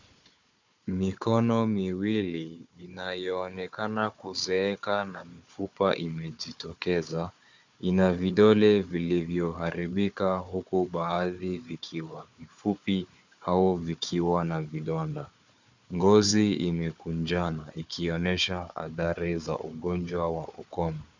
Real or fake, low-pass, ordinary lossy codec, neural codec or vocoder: fake; 7.2 kHz; MP3, 64 kbps; codec, 16 kHz, 4 kbps, FunCodec, trained on Chinese and English, 50 frames a second